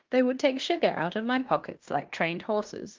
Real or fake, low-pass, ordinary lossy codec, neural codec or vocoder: fake; 7.2 kHz; Opus, 32 kbps; codec, 16 kHz in and 24 kHz out, 0.9 kbps, LongCat-Audio-Codec, fine tuned four codebook decoder